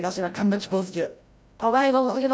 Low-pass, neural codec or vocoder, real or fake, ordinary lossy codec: none; codec, 16 kHz, 0.5 kbps, FreqCodec, larger model; fake; none